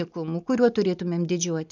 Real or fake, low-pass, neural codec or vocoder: real; 7.2 kHz; none